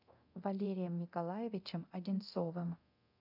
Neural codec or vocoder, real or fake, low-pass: codec, 24 kHz, 0.9 kbps, DualCodec; fake; 5.4 kHz